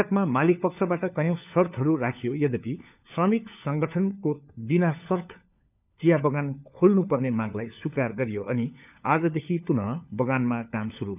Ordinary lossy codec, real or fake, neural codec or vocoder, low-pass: none; fake; codec, 16 kHz, 4 kbps, FunCodec, trained on LibriTTS, 50 frames a second; 3.6 kHz